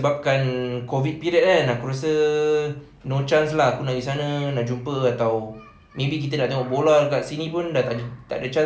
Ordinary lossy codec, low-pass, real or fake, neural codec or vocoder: none; none; real; none